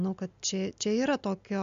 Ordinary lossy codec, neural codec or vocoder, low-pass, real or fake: MP3, 64 kbps; none; 7.2 kHz; real